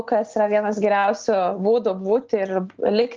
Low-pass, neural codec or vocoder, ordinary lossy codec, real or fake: 7.2 kHz; none; Opus, 24 kbps; real